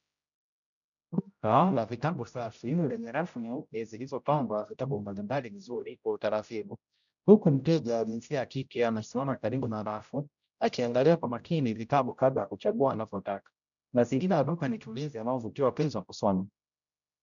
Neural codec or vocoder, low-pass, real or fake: codec, 16 kHz, 0.5 kbps, X-Codec, HuBERT features, trained on general audio; 7.2 kHz; fake